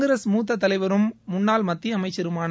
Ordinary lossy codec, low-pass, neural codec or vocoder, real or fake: none; none; none; real